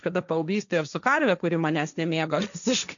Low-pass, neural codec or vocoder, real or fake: 7.2 kHz; codec, 16 kHz, 1.1 kbps, Voila-Tokenizer; fake